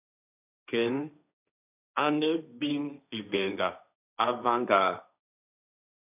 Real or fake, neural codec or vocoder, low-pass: fake; codec, 16 kHz, 1.1 kbps, Voila-Tokenizer; 3.6 kHz